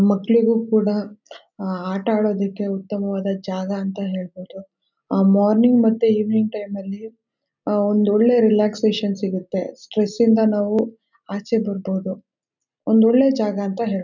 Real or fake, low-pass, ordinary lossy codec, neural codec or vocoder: real; 7.2 kHz; none; none